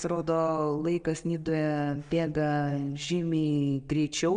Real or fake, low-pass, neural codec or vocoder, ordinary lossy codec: real; 9.9 kHz; none; Opus, 64 kbps